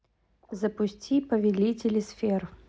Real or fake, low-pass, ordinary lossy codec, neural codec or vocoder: real; none; none; none